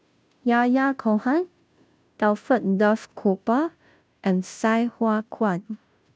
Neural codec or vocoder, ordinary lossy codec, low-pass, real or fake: codec, 16 kHz, 0.5 kbps, FunCodec, trained on Chinese and English, 25 frames a second; none; none; fake